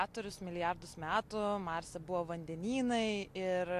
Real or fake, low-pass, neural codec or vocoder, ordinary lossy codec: real; 14.4 kHz; none; AAC, 64 kbps